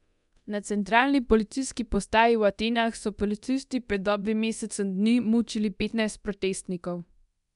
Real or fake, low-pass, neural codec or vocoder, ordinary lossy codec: fake; 10.8 kHz; codec, 24 kHz, 0.9 kbps, DualCodec; none